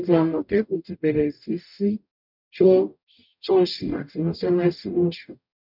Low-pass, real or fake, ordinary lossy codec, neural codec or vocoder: 5.4 kHz; fake; none; codec, 44.1 kHz, 0.9 kbps, DAC